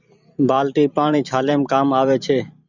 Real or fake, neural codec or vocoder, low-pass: fake; vocoder, 44.1 kHz, 128 mel bands every 512 samples, BigVGAN v2; 7.2 kHz